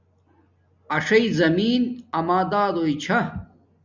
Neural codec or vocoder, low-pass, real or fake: none; 7.2 kHz; real